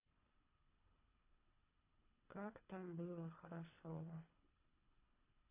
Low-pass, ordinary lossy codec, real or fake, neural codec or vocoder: 3.6 kHz; AAC, 32 kbps; fake; codec, 24 kHz, 3 kbps, HILCodec